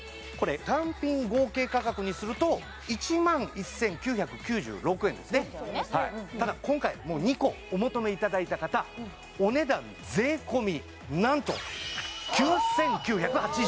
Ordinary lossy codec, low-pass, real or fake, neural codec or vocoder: none; none; real; none